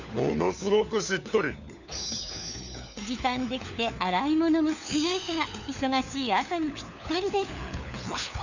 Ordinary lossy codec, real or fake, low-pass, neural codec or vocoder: none; fake; 7.2 kHz; codec, 16 kHz, 4 kbps, FunCodec, trained on LibriTTS, 50 frames a second